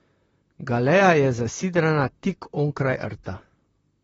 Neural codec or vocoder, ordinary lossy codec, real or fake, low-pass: vocoder, 44.1 kHz, 128 mel bands, Pupu-Vocoder; AAC, 24 kbps; fake; 19.8 kHz